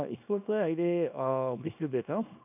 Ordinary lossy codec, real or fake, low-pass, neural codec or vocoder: none; fake; 3.6 kHz; codec, 24 kHz, 0.9 kbps, WavTokenizer, small release